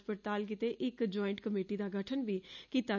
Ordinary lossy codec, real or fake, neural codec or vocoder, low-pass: none; real; none; 7.2 kHz